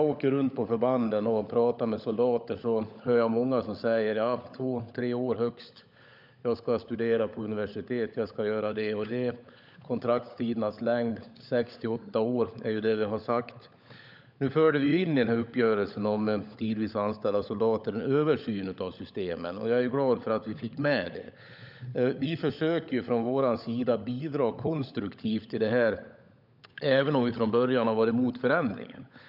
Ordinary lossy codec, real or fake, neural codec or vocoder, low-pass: none; fake; codec, 16 kHz, 16 kbps, FunCodec, trained on LibriTTS, 50 frames a second; 5.4 kHz